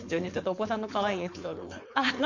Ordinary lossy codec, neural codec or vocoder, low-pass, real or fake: MP3, 48 kbps; codec, 16 kHz, 4.8 kbps, FACodec; 7.2 kHz; fake